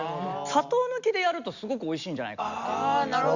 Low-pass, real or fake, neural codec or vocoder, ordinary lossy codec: 7.2 kHz; real; none; Opus, 64 kbps